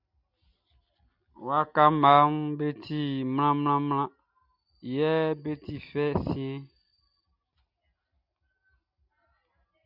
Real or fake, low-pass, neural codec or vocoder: real; 5.4 kHz; none